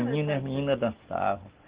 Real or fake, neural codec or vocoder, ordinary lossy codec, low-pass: real; none; Opus, 16 kbps; 3.6 kHz